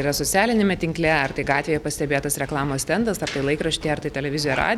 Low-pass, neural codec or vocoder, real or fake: 19.8 kHz; none; real